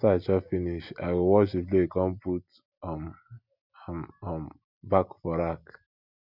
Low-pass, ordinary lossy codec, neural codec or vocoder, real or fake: 5.4 kHz; none; none; real